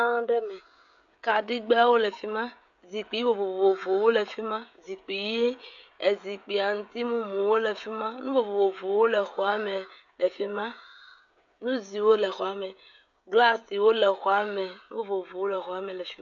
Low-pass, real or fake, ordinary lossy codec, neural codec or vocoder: 7.2 kHz; fake; AAC, 64 kbps; codec, 16 kHz, 16 kbps, FreqCodec, smaller model